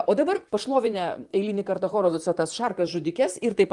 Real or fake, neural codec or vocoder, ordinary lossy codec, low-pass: fake; vocoder, 44.1 kHz, 128 mel bands, Pupu-Vocoder; Opus, 32 kbps; 10.8 kHz